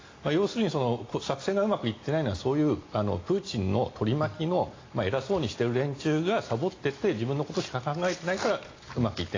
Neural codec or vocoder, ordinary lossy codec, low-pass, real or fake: none; AAC, 32 kbps; 7.2 kHz; real